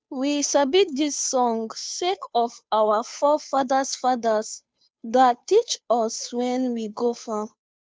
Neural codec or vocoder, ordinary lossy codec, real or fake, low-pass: codec, 16 kHz, 2 kbps, FunCodec, trained on Chinese and English, 25 frames a second; none; fake; none